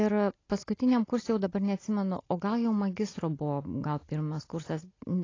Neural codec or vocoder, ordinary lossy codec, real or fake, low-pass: none; AAC, 32 kbps; real; 7.2 kHz